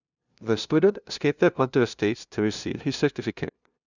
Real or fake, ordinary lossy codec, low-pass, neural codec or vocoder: fake; none; 7.2 kHz; codec, 16 kHz, 0.5 kbps, FunCodec, trained on LibriTTS, 25 frames a second